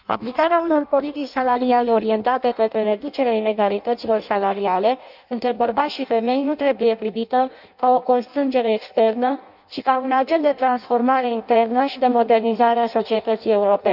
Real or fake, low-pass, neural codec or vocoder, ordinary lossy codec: fake; 5.4 kHz; codec, 16 kHz in and 24 kHz out, 0.6 kbps, FireRedTTS-2 codec; none